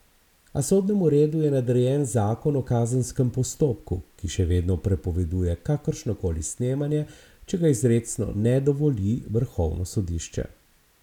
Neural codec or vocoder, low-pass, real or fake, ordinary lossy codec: none; 19.8 kHz; real; none